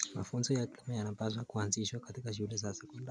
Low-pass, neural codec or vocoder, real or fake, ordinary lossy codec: 9.9 kHz; none; real; MP3, 96 kbps